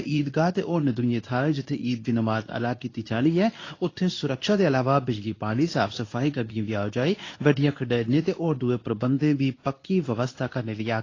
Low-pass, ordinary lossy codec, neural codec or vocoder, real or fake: 7.2 kHz; AAC, 32 kbps; codec, 24 kHz, 0.9 kbps, WavTokenizer, medium speech release version 2; fake